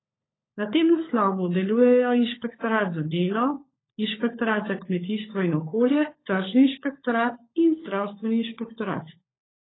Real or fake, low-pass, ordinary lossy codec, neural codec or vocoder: fake; 7.2 kHz; AAC, 16 kbps; codec, 16 kHz, 16 kbps, FunCodec, trained on LibriTTS, 50 frames a second